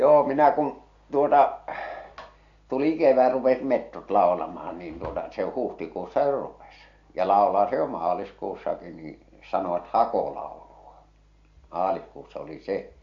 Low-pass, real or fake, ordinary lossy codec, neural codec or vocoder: 7.2 kHz; real; AAC, 48 kbps; none